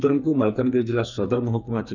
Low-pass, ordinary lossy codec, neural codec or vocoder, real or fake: 7.2 kHz; none; codec, 44.1 kHz, 2.6 kbps, SNAC; fake